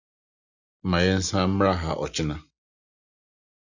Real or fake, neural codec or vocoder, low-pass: real; none; 7.2 kHz